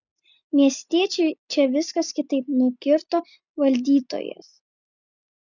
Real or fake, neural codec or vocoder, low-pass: real; none; 7.2 kHz